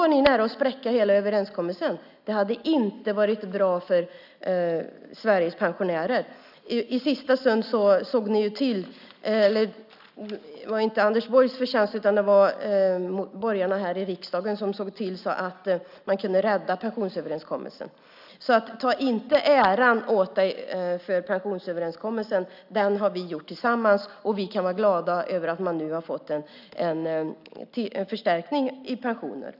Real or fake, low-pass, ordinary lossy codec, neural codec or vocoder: real; 5.4 kHz; none; none